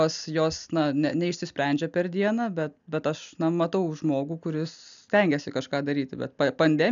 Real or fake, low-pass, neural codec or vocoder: real; 7.2 kHz; none